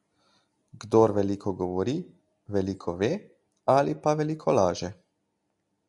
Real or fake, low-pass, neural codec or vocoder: real; 10.8 kHz; none